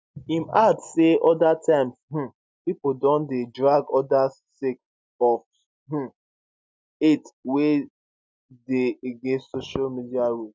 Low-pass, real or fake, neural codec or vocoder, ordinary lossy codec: none; real; none; none